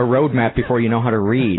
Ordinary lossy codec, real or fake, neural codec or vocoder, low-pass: AAC, 16 kbps; real; none; 7.2 kHz